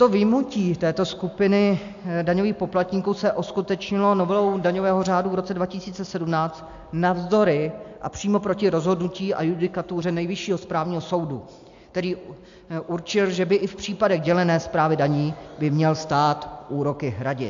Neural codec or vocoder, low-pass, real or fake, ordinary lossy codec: none; 7.2 kHz; real; AAC, 64 kbps